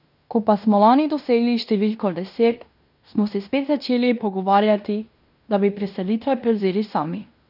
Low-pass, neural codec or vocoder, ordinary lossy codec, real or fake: 5.4 kHz; codec, 16 kHz in and 24 kHz out, 0.9 kbps, LongCat-Audio-Codec, fine tuned four codebook decoder; none; fake